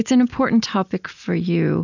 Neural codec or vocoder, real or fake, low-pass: none; real; 7.2 kHz